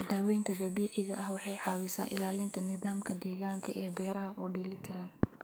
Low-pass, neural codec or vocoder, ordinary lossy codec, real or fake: none; codec, 44.1 kHz, 2.6 kbps, SNAC; none; fake